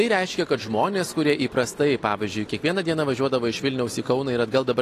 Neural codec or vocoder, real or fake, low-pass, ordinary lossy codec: none; real; 14.4 kHz; AAC, 48 kbps